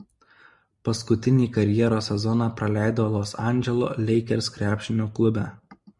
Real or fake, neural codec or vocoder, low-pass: real; none; 10.8 kHz